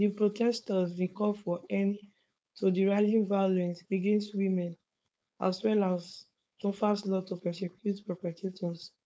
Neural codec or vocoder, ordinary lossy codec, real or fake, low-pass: codec, 16 kHz, 4.8 kbps, FACodec; none; fake; none